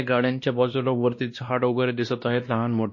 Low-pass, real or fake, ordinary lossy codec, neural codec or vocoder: 7.2 kHz; fake; MP3, 32 kbps; codec, 16 kHz, 1 kbps, X-Codec, WavLM features, trained on Multilingual LibriSpeech